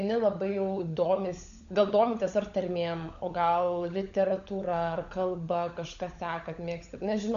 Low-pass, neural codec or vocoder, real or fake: 7.2 kHz; codec, 16 kHz, 16 kbps, FunCodec, trained on LibriTTS, 50 frames a second; fake